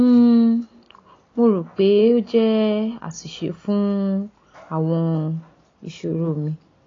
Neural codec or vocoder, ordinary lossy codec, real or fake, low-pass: none; AAC, 32 kbps; real; 7.2 kHz